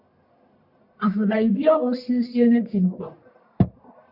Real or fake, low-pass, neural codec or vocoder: fake; 5.4 kHz; codec, 44.1 kHz, 1.7 kbps, Pupu-Codec